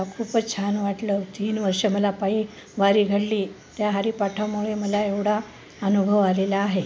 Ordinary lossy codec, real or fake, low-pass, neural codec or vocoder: none; real; none; none